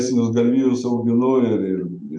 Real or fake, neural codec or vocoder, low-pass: real; none; 9.9 kHz